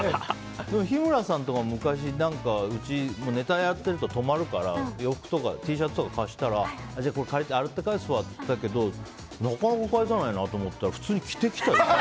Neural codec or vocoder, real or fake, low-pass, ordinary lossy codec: none; real; none; none